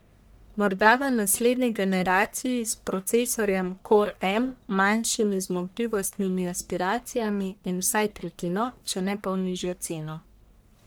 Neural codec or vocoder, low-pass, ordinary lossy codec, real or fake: codec, 44.1 kHz, 1.7 kbps, Pupu-Codec; none; none; fake